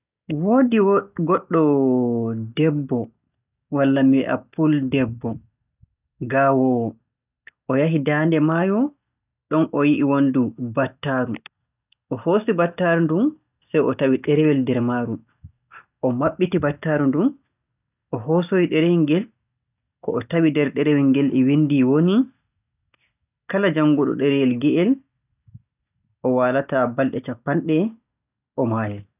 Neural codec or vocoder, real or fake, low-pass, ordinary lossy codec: none; real; 3.6 kHz; none